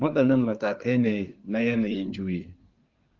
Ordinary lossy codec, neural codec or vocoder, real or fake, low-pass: Opus, 24 kbps; codec, 24 kHz, 1 kbps, SNAC; fake; 7.2 kHz